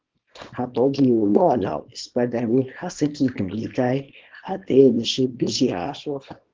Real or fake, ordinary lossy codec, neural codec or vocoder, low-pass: fake; Opus, 16 kbps; codec, 24 kHz, 0.9 kbps, WavTokenizer, small release; 7.2 kHz